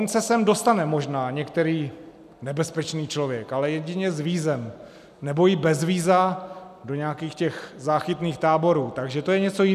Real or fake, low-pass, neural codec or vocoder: real; 14.4 kHz; none